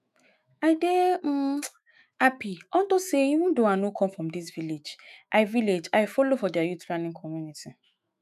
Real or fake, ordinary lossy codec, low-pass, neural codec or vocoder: fake; none; 14.4 kHz; autoencoder, 48 kHz, 128 numbers a frame, DAC-VAE, trained on Japanese speech